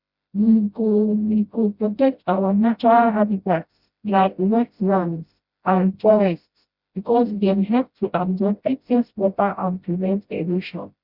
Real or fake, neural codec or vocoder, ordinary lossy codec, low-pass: fake; codec, 16 kHz, 0.5 kbps, FreqCodec, smaller model; Opus, 64 kbps; 5.4 kHz